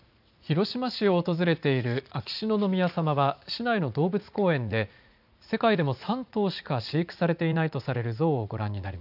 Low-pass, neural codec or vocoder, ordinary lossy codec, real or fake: 5.4 kHz; vocoder, 44.1 kHz, 80 mel bands, Vocos; none; fake